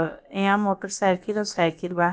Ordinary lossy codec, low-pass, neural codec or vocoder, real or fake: none; none; codec, 16 kHz, about 1 kbps, DyCAST, with the encoder's durations; fake